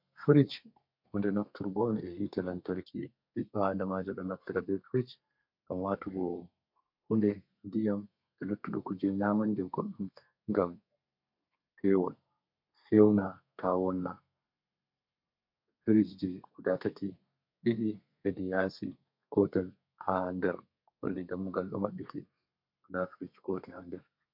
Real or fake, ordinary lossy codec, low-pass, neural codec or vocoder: fake; MP3, 48 kbps; 5.4 kHz; codec, 32 kHz, 1.9 kbps, SNAC